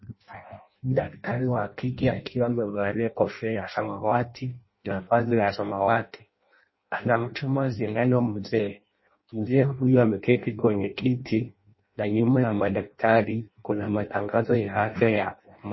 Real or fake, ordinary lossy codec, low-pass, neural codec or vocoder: fake; MP3, 24 kbps; 7.2 kHz; codec, 16 kHz in and 24 kHz out, 0.6 kbps, FireRedTTS-2 codec